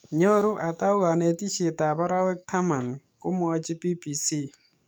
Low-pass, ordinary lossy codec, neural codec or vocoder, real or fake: none; none; codec, 44.1 kHz, 7.8 kbps, DAC; fake